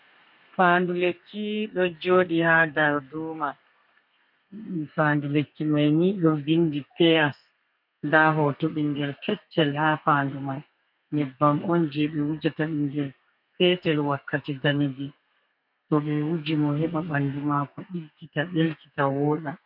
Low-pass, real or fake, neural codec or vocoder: 5.4 kHz; fake; codec, 32 kHz, 1.9 kbps, SNAC